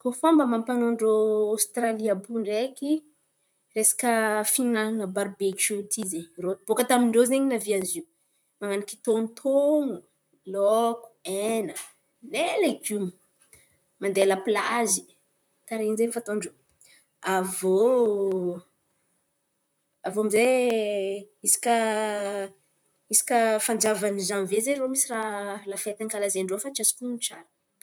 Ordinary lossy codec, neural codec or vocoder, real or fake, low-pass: none; vocoder, 44.1 kHz, 128 mel bands, Pupu-Vocoder; fake; none